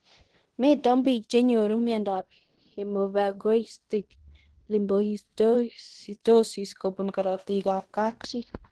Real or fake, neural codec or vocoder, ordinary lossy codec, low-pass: fake; codec, 16 kHz in and 24 kHz out, 0.9 kbps, LongCat-Audio-Codec, fine tuned four codebook decoder; Opus, 16 kbps; 10.8 kHz